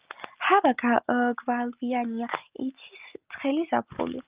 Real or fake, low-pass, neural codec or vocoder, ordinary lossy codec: real; 3.6 kHz; none; Opus, 32 kbps